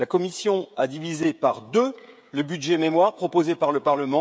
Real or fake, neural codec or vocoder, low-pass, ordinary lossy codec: fake; codec, 16 kHz, 16 kbps, FreqCodec, smaller model; none; none